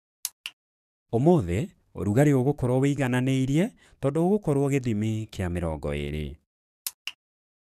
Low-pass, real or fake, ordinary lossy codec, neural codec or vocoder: 14.4 kHz; fake; none; codec, 44.1 kHz, 7.8 kbps, DAC